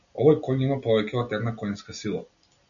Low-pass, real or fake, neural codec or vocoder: 7.2 kHz; real; none